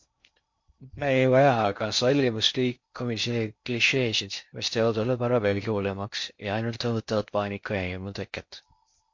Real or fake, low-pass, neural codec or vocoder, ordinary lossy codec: fake; 7.2 kHz; codec, 16 kHz in and 24 kHz out, 0.6 kbps, FocalCodec, streaming, 2048 codes; MP3, 48 kbps